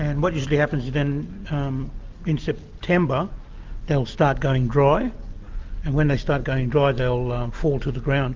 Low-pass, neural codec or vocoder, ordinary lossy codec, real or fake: 7.2 kHz; none; Opus, 32 kbps; real